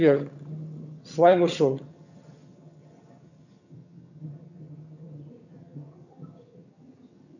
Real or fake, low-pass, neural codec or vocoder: fake; 7.2 kHz; vocoder, 22.05 kHz, 80 mel bands, HiFi-GAN